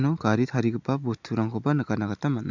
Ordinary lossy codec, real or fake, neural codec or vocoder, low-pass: MP3, 64 kbps; real; none; 7.2 kHz